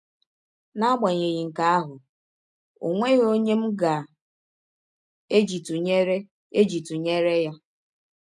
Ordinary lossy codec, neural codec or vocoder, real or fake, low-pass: none; none; real; none